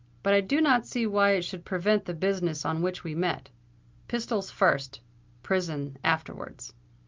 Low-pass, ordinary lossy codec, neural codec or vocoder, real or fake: 7.2 kHz; Opus, 32 kbps; none; real